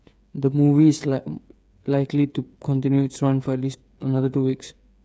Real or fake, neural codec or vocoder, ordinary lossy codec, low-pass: fake; codec, 16 kHz, 8 kbps, FreqCodec, smaller model; none; none